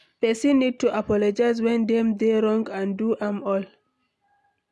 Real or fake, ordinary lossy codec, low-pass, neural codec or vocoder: real; none; none; none